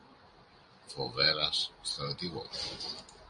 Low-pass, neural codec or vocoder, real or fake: 9.9 kHz; none; real